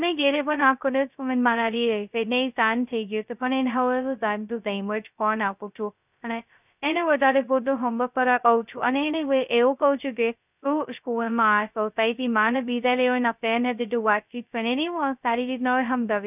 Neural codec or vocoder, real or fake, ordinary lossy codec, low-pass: codec, 16 kHz, 0.2 kbps, FocalCodec; fake; none; 3.6 kHz